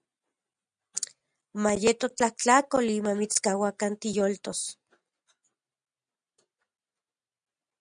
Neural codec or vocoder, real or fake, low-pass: none; real; 9.9 kHz